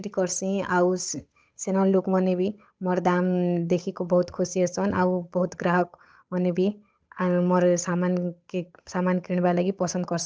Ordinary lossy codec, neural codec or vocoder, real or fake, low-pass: none; codec, 16 kHz, 8 kbps, FunCodec, trained on Chinese and English, 25 frames a second; fake; none